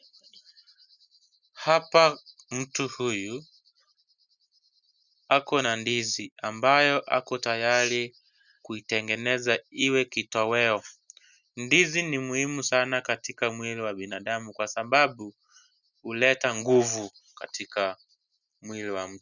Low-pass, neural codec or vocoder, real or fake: 7.2 kHz; none; real